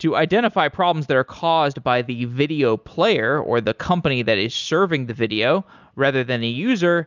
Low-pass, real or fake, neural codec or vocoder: 7.2 kHz; real; none